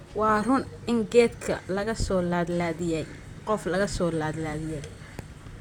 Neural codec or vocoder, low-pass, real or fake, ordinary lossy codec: vocoder, 44.1 kHz, 128 mel bands, Pupu-Vocoder; 19.8 kHz; fake; none